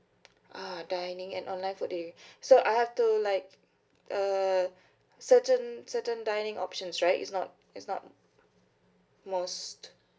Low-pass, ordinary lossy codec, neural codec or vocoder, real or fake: none; none; none; real